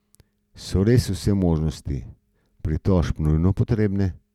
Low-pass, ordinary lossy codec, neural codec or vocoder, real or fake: 19.8 kHz; none; none; real